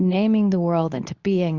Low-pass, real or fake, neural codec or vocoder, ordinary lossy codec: 7.2 kHz; fake; codec, 24 kHz, 0.9 kbps, WavTokenizer, medium speech release version 2; Opus, 64 kbps